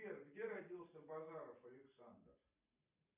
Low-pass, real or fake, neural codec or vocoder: 3.6 kHz; fake; vocoder, 44.1 kHz, 128 mel bands every 256 samples, BigVGAN v2